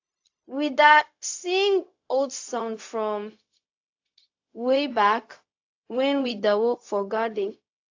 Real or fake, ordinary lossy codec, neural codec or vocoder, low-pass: fake; AAC, 48 kbps; codec, 16 kHz, 0.4 kbps, LongCat-Audio-Codec; 7.2 kHz